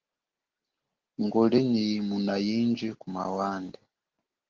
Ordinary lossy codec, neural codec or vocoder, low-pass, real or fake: Opus, 16 kbps; none; 7.2 kHz; real